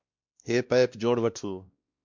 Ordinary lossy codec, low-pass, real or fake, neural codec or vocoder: MP3, 64 kbps; 7.2 kHz; fake; codec, 16 kHz, 1 kbps, X-Codec, WavLM features, trained on Multilingual LibriSpeech